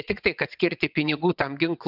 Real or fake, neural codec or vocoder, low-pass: fake; vocoder, 22.05 kHz, 80 mel bands, WaveNeXt; 5.4 kHz